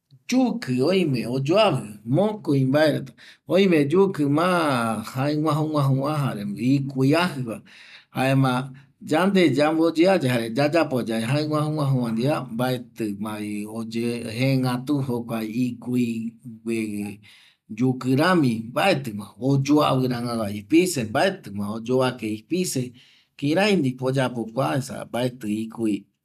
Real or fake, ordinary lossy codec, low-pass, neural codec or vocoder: real; none; 14.4 kHz; none